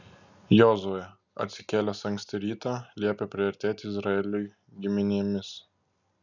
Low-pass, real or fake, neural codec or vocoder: 7.2 kHz; real; none